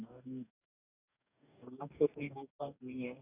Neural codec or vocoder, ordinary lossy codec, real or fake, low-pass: codec, 44.1 kHz, 2.6 kbps, DAC; none; fake; 3.6 kHz